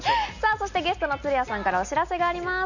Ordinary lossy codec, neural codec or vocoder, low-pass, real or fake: none; none; 7.2 kHz; real